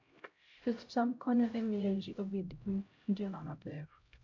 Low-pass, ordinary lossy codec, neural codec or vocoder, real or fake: 7.2 kHz; MP3, 48 kbps; codec, 16 kHz, 0.5 kbps, X-Codec, HuBERT features, trained on LibriSpeech; fake